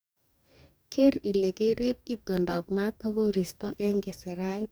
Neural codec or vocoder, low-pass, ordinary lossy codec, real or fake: codec, 44.1 kHz, 2.6 kbps, DAC; none; none; fake